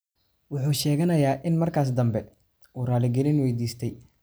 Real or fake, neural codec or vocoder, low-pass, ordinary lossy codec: real; none; none; none